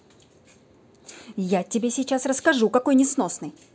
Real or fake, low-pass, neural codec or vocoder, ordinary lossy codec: real; none; none; none